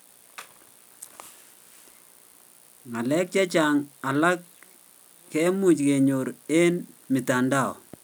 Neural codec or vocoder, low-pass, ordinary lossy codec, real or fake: none; none; none; real